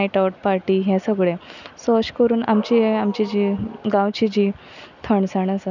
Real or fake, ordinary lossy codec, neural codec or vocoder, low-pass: real; none; none; 7.2 kHz